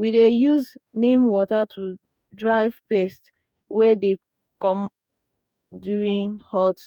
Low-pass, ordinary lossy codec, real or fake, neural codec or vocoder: 19.8 kHz; none; fake; codec, 44.1 kHz, 2.6 kbps, DAC